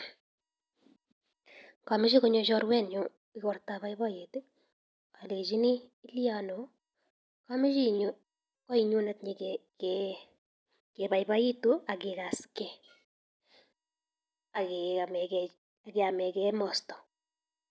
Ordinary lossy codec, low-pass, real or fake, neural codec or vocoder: none; none; real; none